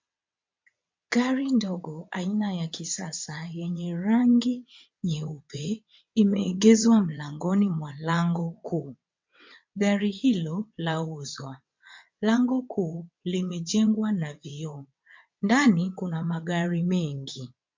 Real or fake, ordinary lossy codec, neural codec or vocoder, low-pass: fake; MP3, 48 kbps; vocoder, 22.05 kHz, 80 mel bands, WaveNeXt; 7.2 kHz